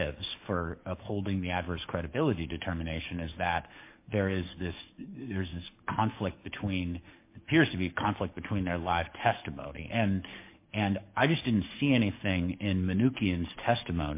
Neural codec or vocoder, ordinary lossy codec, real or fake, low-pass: codec, 44.1 kHz, 7.8 kbps, DAC; MP3, 24 kbps; fake; 3.6 kHz